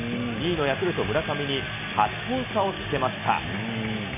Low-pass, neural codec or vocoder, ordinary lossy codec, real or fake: 3.6 kHz; none; none; real